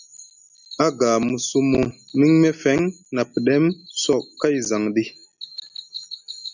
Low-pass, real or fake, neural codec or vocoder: 7.2 kHz; real; none